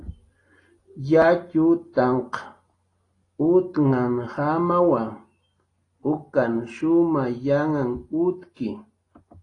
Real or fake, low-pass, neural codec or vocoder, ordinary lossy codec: real; 10.8 kHz; none; AAC, 32 kbps